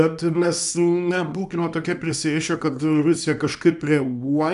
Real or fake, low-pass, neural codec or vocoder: fake; 10.8 kHz; codec, 24 kHz, 0.9 kbps, WavTokenizer, small release